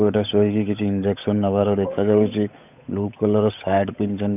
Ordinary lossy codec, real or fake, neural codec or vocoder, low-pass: none; fake; codec, 16 kHz, 8 kbps, FunCodec, trained on Chinese and English, 25 frames a second; 3.6 kHz